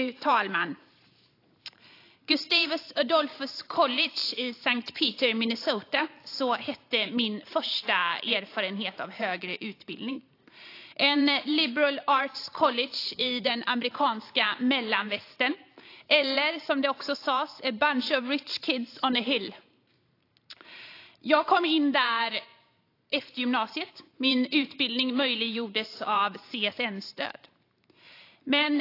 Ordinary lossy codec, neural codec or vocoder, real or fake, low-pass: AAC, 32 kbps; vocoder, 44.1 kHz, 128 mel bands every 512 samples, BigVGAN v2; fake; 5.4 kHz